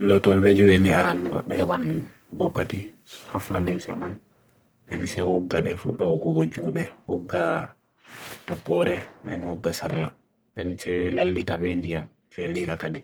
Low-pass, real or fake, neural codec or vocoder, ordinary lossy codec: none; fake; codec, 44.1 kHz, 1.7 kbps, Pupu-Codec; none